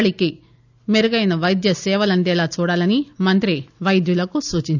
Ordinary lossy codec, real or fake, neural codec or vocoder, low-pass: none; real; none; 7.2 kHz